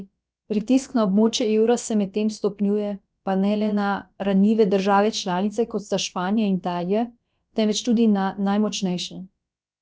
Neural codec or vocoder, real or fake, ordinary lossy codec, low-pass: codec, 16 kHz, about 1 kbps, DyCAST, with the encoder's durations; fake; none; none